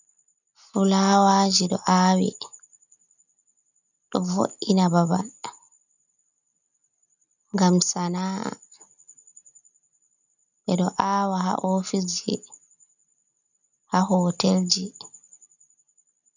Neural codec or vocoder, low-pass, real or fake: none; 7.2 kHz; real